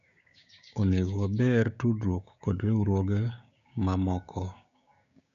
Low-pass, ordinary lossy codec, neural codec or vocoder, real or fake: 7.2 kHz; none; codec, 16 kHz, 6 kbps, DAC; fake